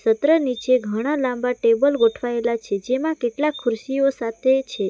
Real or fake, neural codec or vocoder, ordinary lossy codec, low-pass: real; none; none; none